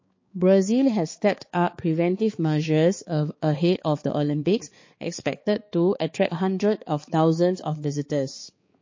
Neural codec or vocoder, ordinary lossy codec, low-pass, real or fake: codec, 16 kHz, 4 kbps, X-Codec, HuBERT features, trained on balanced general audio; MP3, 32 kbps; 7.2 kHz; fake